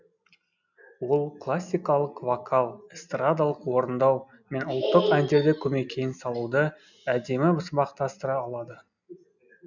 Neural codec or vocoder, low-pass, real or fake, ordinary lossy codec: none; 7.2 kHz; real; none